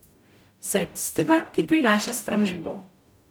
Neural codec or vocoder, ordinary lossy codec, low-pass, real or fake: codec, 44.1 kHz, 0.9 kbps, DAC; none; none; fake